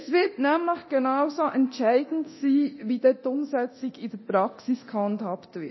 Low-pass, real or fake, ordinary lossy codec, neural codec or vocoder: 7.2 kHz; fake; MP3, 24 kbps; codec, 24 kHz, 0.9 kbps, DualCodec